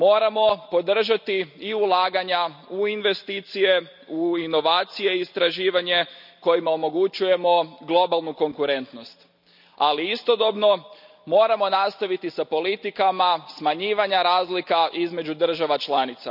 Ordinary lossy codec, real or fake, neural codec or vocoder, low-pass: none; real; none; 5.4 kHz